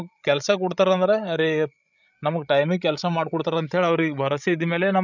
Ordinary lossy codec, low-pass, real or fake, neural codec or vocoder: none; 7.2 kHz; fake; codec, 16 kHz, 16 kbps, FreqCodec, larger model